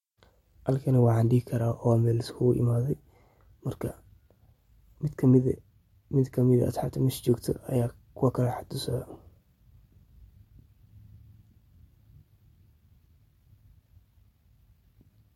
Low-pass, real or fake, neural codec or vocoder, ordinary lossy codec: 19.8 kHz; real; none; MP3, 64 kbps